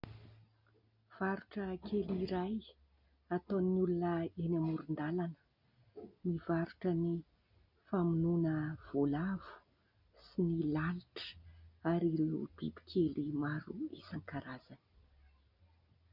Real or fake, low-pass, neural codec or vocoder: real; 5.4 kHz; none